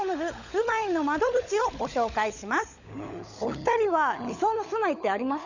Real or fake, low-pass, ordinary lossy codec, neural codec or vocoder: fake; 7.2 kHz; none; codec, 16 kHz, 16 kbps, FunCodec, trained on LibriTTS, 50 frames a second